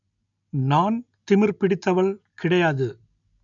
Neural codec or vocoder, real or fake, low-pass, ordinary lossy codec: none; real; 7.2 kHz; MP3, 96 kbps